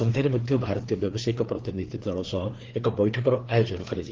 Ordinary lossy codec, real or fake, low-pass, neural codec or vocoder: Opus, 16 kbps; fake; 7.2 kHz; codec, 16 kHz, 4 kbps, FreqCodec, larger model